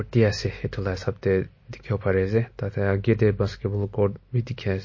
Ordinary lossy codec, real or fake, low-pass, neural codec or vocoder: MP3, 32 kbps; real; 7.2 kHz; none